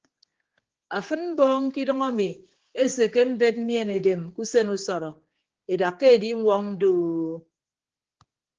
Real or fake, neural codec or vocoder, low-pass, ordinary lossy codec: fake; codec, 16 kHz, 4 kbps, X-Codec, HuBERT features, trained on general audio; 7.2 kHz; Opus, 16 kbps